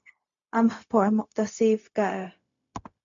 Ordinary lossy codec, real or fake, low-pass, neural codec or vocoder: MP3, 64 kbps; fake; 7.2 kHz; codec, 16 kHz, 0.4 kbps, LongCat-Audio-Codec